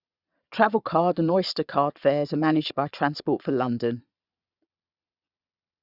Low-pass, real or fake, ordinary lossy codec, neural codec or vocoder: 5.4 kHz; fake; Opus, 64 kbps; vocoder, 22.05 kHz, 80 mel bands, Vocos